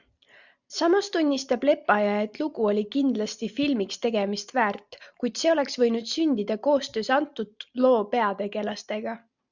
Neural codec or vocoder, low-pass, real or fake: none; 7.2 kHz; real